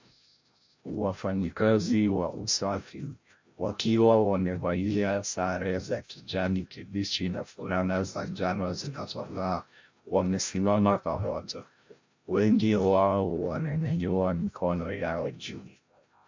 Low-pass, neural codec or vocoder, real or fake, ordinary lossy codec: 7.2 kHz; codec, 16 kHz, 0.5 kbps, FreqCodec, larger model; fake; MP3, 48 kbps